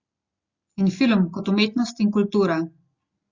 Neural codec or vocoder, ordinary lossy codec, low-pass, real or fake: none; Opus, 64 kbps; 7.2 kHz; real